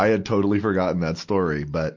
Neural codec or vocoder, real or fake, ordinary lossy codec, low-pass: none; real; MP3, 48 kbps; 7.2 kHz